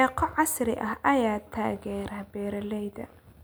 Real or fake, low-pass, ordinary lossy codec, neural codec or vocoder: real; none; none; none